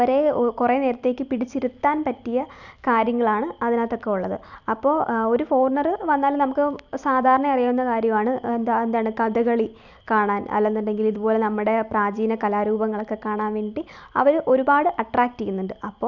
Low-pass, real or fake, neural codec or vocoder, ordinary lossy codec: 7.2 kHz; real; none; none